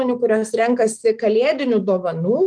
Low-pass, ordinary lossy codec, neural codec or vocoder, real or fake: 9.9 kHz; Opus, 24 kbps; autoencoder, 48 kHz, 128 numbers a frame, DAC-VAE, trained on Japanese speech; fake